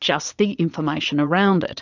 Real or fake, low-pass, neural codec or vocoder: fake; 7.2 kHz; vocoder, 22.05 kHz, 80 mel bands, Vocos